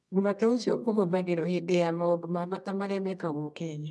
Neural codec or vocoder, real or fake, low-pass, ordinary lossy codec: codec, 24 kHz, 0.9 kbps, WavTokenizer, medium music audio release; fake; none; none